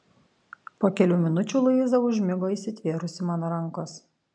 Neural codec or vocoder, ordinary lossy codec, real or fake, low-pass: none; MP3, 64 kbps; real; 9.9 kHz